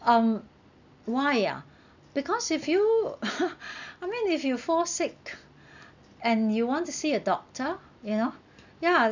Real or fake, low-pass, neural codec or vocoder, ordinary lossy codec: real; 7.2 kHz; none; none